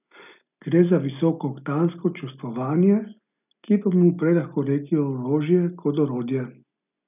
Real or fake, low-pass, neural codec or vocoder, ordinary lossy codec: real; 3.6 kHz; none; none